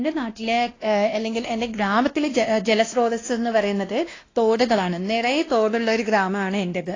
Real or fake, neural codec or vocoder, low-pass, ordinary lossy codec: fake; codec, 16 kHz, 1 kbps, X-Codec, WavLM features, trained on Multilingual LibriSpeech; 7.2 kHz; AAC, 32 kbps